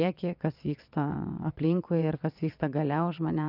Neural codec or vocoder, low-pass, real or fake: vocoder, 22.05 kHz, 80 mel bands, WaveNeXt; 5.4 kHz; fake